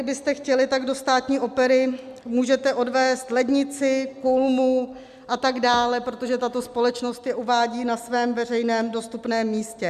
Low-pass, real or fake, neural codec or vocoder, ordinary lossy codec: 14.4 kHz; real; none; MP3, 96 kbps